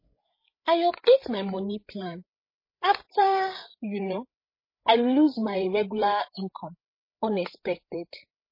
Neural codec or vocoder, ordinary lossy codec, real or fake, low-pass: codec, 16 kHz, 8 kbps, FreqCodec, larger model; MP3, 24 kbps; fake; 5.4 kHz